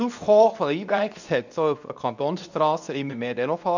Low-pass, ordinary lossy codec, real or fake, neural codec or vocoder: 7.2 kHz; none; fake; codec, 24 kHz, 0.9 kbps, WavTokenizer, medium speech release version 2